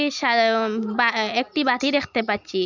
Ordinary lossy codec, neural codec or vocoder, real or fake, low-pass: none; none; real; 7.2 kHz